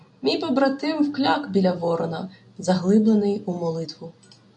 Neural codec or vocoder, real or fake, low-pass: none; real; 9.9 kHz